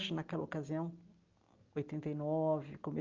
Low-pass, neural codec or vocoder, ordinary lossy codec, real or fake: 7.2 kHz; none; Opus, 24 kbps; real